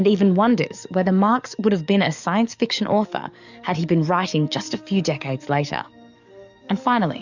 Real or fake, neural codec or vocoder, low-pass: fake; codec, 44.1 kHz, 7.8 kbps, DAC; 7.2 kHz